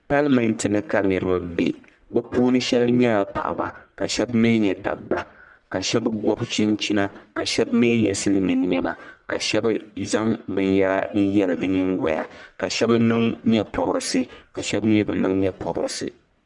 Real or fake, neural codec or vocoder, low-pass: fake; codec, 44.1 kHz, 1.7 kbps, Pupu-Codec; 10.8 kHz